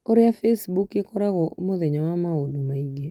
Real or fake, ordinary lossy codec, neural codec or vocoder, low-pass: real; Opus, 32 kbps; none; 19.8 kHz